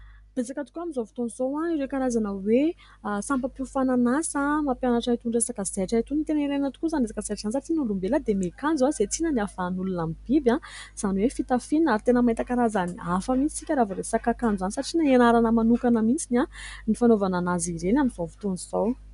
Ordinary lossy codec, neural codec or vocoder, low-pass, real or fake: MP3, 96 kbps; none; 10.8 kHz; real